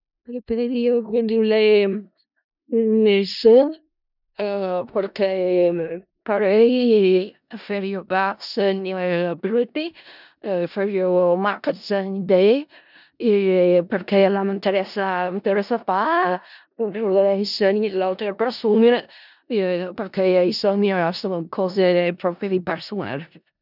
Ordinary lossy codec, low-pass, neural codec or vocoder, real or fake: none; 5.4 kHz; codec, 16 kHz in and 24 kHz out, 0.4 kbps, LongCat-Audio-Codec, four codebook decoder; fake